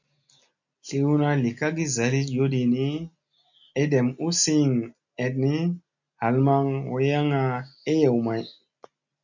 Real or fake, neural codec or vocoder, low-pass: real; none; 7.2 kHz